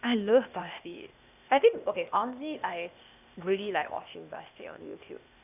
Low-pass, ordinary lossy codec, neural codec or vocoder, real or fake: 3.6 kHz; none; codec, 16 kHz, 0.8 kbps, ZipCodec; fake